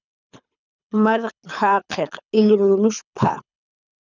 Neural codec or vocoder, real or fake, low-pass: codec, 24 kHz, 6 kbps, HILCodec; fake; 7.2 kHz